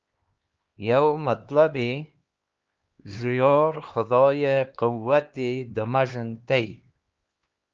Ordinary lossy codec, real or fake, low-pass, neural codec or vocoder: Opus, 24 kbps; fake; 7.2 kHz; codec, 16 kHz, 2 kbps, X-Codec, HuBERT features, trained on LibriSpeech